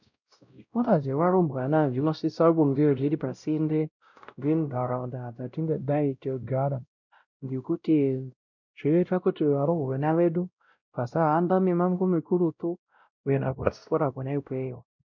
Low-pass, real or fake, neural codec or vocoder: 7.2 kHz; fake; codec, 16 kHz, 0.5 kbps, X-Codec, WavLM features, trained on Multilingual LibriSpeech